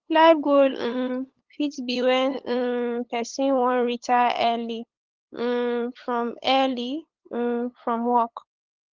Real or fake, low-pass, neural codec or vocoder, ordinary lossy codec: fake; 7.2 kHz; codec, 16 kHz, 8 kbps, FunCodec, trained on LibriTTS, 25 frames a second; Opus, 16 kbps